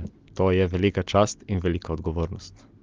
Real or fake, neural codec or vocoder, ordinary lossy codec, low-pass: real; none; Opus, 32 kbps; 7.2 kHz